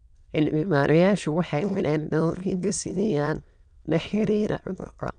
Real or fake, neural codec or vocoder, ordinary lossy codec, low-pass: fake; autoencoder, 22.05 kHz, a latent of 192 numbers a frame, VITS, trained on many speakers; none; 9.9 kHz